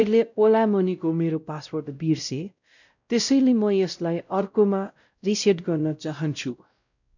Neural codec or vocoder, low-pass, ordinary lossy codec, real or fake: codec, 16 kHz, 0.5 kbps, X-Codec, WavLM features, trained on Multilingual LibriSpeech; 7.2 kHz; none; fake